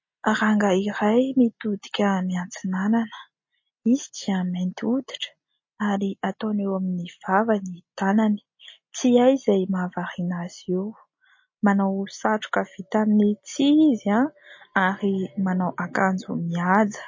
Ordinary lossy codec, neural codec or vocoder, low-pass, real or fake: MP3, 32 kbps; none; 7.2 kHz; real